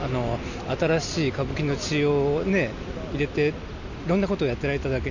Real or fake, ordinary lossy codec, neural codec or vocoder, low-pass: real; none; none; 7.2 kHz